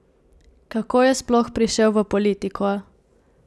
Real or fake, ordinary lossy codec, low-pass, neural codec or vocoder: real; none; none; none